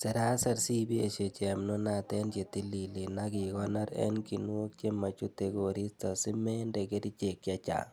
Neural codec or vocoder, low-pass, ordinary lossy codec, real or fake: none; none; none; real